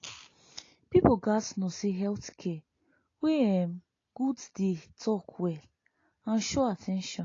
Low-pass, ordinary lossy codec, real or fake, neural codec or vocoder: 7.2 kHz; AAC, 32 kbps; real; none